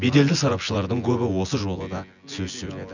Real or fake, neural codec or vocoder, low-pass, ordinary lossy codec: fake; vocoder, 24 kHz, 100 mel bands, Vocos; 7.2 kHz; none